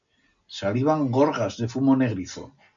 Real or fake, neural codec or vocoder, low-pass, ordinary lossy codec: real; none; 7.2 kHz; MP3, 64 kbps